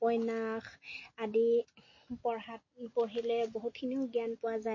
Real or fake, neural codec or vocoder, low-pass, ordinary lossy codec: real; none; 7.2 kHz; MP3, 32 kbps